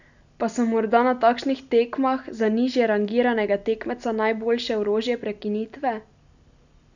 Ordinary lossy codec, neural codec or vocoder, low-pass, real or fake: none; none; 7.2 kHz; real